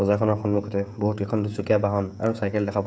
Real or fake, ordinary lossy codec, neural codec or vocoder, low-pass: fake; none; codec, 16 kHz, 16 kbps, FreqCodec, smaller model; none